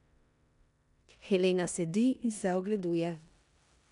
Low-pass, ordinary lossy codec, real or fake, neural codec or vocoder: 10.8 kHz; none; fake; codec, 16 kHz in and 24 kHz out, 0.9 kbps, LongCat-Audio-Codec, four codebook decoder